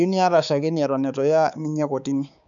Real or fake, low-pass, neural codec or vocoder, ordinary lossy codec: fake; 7.2 kHz; codec, 16 kHz, 4 kbps, X-Codec, HuBERT features, trained on balanced general audio; none